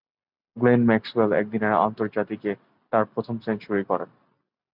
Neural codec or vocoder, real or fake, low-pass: none; real; 5.4 kHz